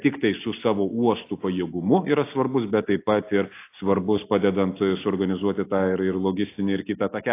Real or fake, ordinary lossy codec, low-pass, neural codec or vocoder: real; AAC, 24 kbps; 3.6 kHz; none